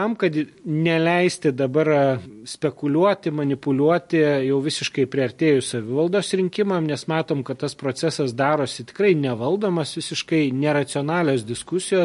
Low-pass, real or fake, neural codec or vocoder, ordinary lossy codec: 14.4 kHz; real; none; MP3, 48 kbps